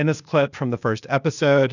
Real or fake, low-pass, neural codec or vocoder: fake; 7.2 kHz; codec, 16 kHz, 0.8 kbps, ZipCodec